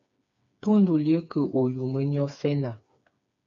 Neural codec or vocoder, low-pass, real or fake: codec, 16 kHz, 4 kbps, FreqCodec, smaller model; 7.2 kHz; fake